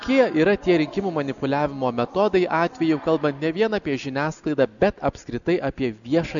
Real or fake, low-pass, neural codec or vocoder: real; 7.2 kHz; none